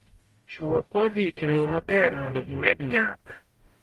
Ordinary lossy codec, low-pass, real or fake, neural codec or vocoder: Opus, 24 kbps; 14.4 kHz; fake; codec, 44.1 kHz, 0.9 kbps, DAC